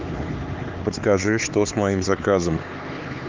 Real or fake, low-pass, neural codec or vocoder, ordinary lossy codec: fake; 7.2 kHz; codec, 16 kHz, 4 kbps, X-Codec, HuBERT features, trained on LibriSpeech; Opus, 24 kbps